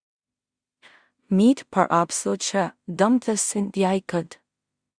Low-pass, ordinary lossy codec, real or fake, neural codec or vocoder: 9.9 kHz; Opus, 64 kbps; fake; codec, 16 kHz in and 24 kHz out, 0.4 kbps, LongCat-Audio-Codec, two codebook decoder